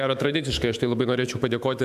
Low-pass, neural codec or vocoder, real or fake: 14.4 kHz; autoencoder, 48 kHz, 128 numbers a frame, DAC-VAE, trained on Japanese speech; fake